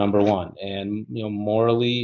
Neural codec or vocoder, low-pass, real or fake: none; 7.2 kHz; real